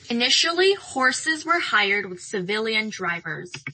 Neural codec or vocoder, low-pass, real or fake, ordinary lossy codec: none; 9.9 kHz; real; MP3, 32 kbps